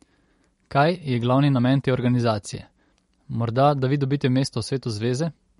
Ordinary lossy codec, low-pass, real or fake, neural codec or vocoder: MP3, 48 kbps; 19.8 kHz; real; none